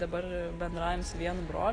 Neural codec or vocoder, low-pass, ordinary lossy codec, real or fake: none; 9.9 kHz; AAC, 32 kbps; real